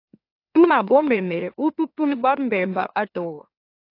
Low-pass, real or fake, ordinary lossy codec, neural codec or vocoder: 5.4 kHz; fake; AAC, 32 kbps; autoencoder, 44.1 kHz, a latent of 192 numbers a frame, MeloTTS